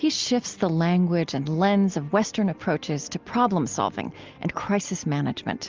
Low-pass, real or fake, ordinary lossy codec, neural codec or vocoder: 7.2 kHz; real; Opus, 24 kbps; none